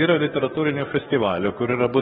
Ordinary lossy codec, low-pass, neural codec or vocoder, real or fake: AAC, 16 kbps; 19.8 kHz; codec, 44.1 kHz, 7.8 kbps, DAC; fake